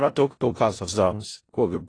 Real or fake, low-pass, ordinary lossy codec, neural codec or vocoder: fake; 9.9 kHz; AAC, 32 kbps; codec, 16 kHz in and 24 kHz out, 0.4 kbps, LongCat-Audio-Codec, four codebook decoder